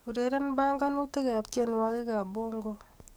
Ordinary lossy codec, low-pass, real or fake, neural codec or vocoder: none; none; fake; codec, 44.1 kHz, 7.8 kbps, DAC